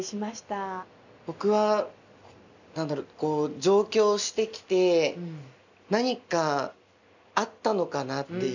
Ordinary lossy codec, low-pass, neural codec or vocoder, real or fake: none; 7.2 kHz; none; real